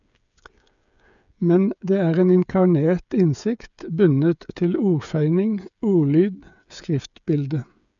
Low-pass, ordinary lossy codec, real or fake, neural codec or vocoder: 7.2 kHz; none; fake; codec, 16 kHz, 8 kbps, FreqCodec, smaller model